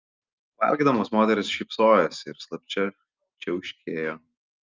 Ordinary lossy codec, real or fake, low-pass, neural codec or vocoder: Opus, 24 kbps; real; 7.2 kHz; none